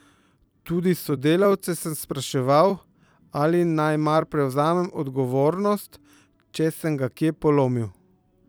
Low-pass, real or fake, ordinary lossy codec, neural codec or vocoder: none; fake; none; vocoder, 44.1 kHz, 128 mel bands every 256 samples, BigVGAN v2